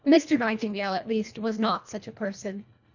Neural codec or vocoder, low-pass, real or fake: codec, 24 kHz, 1.5 kbps, HILCodec; 7.2 kHz; fake